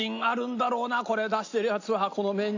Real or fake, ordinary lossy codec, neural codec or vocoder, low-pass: real; AAC, 48 kbps; none; 7.2 kHz